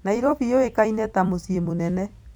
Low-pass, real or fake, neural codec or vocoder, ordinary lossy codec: 19.8 kHz; fake; vocoder, 44.1 kHz, 128 mel bands every 256 samples, BigVGAN v2; none